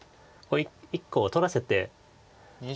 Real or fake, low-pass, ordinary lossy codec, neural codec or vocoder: real; none; none; none